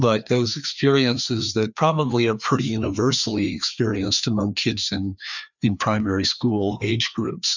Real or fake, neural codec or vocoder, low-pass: fake; codec, 16 kHz, 2 kbps, FreqCodec, larger model; 7.2 kHz